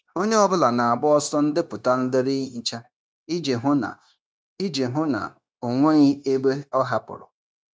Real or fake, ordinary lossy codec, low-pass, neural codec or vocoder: fake; none; none; codec, 16 kHz, 0.9 kbps, LongCat-Audio-Codec